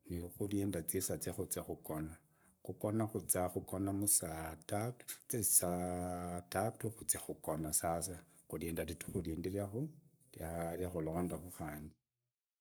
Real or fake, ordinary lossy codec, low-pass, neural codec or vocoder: fake; none; none; codec, 44.1 kHz, 7.8 kbps, Pupu-Codec